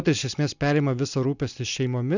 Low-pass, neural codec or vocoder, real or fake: 7.2 kHz; none; real